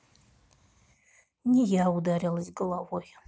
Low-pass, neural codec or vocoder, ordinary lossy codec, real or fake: none; none; none; real